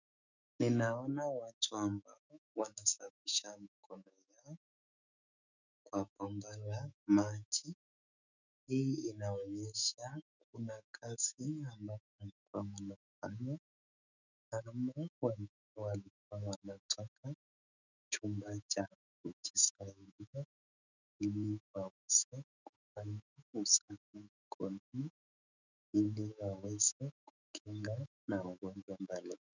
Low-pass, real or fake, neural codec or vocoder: 7.2 kHz; fake; autoencoder, 48 kHz, 128 numbers a frame, DAC-VAE, trained on Japanese speech